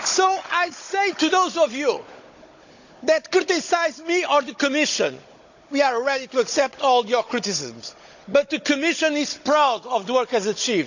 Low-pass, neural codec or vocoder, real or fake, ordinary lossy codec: 7.2 kHz; codec, 16 kHz, 16 kbps, FunCodec, trained on Chinese and English, 50 frames a second; fake; none